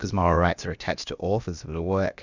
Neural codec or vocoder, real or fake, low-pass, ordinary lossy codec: codec, 16 kHz, about 1 kbps, DyCAST, with the encoder's durations; fake; 7.2 kHz; Opus, 64 kbps